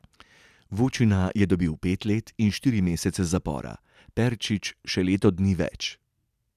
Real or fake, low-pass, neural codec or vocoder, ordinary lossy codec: real; 14.4 kHz; none; none